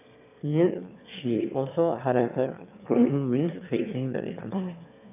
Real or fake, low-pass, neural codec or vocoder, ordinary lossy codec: fake; 3.6 kHz; autoencoder, 22.05 kHz, a latent of 192 numbers a frame, VITS, trained on one speaker; none